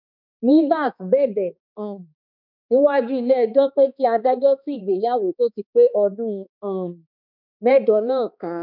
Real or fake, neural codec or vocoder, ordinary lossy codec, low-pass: fake; codec, 16 kHz, 2 kbps, X-Codec, HuBERT features, trained on balanced general audio; none; 5.4 kHz